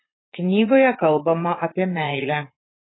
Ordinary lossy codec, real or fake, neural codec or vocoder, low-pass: AAC, 16 kbps; fake; vocoder, 44.1 kHz, 80 mel bands, Vocos; 7.2 kHz